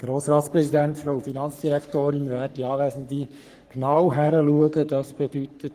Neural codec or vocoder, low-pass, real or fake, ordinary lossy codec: codec, 44.1 kHz, 3.4 kbps, Pupu-Codec; 14.4 kHz; fake; Opus, 24 kbps